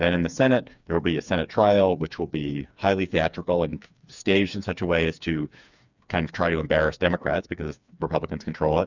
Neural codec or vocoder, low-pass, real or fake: codec, 16 kHz, 4 kbps, FreqCodec, smaller model; 7.2 kHz; fake